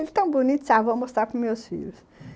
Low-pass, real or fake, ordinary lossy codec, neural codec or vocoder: none; real; none; none